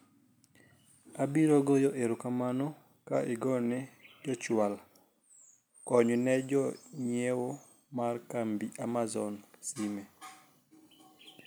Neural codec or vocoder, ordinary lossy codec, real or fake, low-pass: none; none; real; none